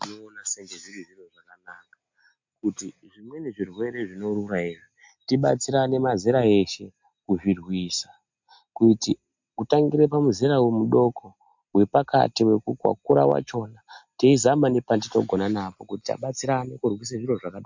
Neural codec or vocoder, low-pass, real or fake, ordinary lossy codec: none; 7.2 kHz; real; MP3, 64 kbps